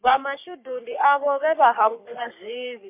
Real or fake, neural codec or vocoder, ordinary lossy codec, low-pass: fake; codec, 44.1 kHz, 3.4 kbps, Pupu-Codec; MP3, 32 kbps; 3.6 kHz